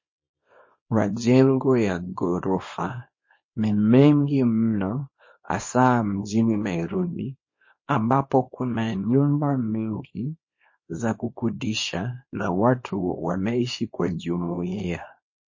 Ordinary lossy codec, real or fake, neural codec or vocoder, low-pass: MP3, 32 kbps; fake; codec, 24 kHz, 0.9 kbps, WavTokenizer, small release; 7.2 kHz